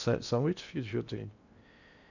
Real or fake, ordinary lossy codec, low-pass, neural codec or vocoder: fake; none; 7.2 kHz; codec, 16 kHz in and 24 kHz out, 0.8 kbps, FocalCodec, streaming, 65536 codes